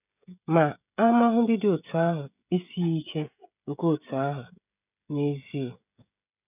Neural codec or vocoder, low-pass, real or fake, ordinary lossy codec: codec, 16 kHz, 16 kbps, FreqCodec, smaller model; 3.6 kHz; fake; none